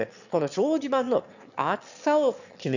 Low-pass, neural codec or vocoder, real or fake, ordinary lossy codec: 7.2 kHz; autoencoder, 22.05 kHz, a latent of 192 numbers a frame, VITS, trained on one speaker; fake; none